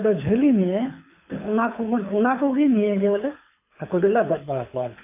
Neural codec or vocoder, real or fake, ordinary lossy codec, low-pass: codec, 24 kHz, 6 kbps, HILCodec; fake; AAC, 32 kbps; 3.6 kHz